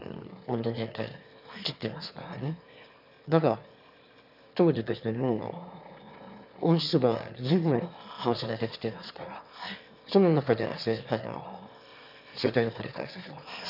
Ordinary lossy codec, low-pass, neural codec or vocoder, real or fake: none; 5.4 kHz; autoencoder, 22.05 kHz, a latent of 192 numbers a frame, VITS, trained on one speaker; fake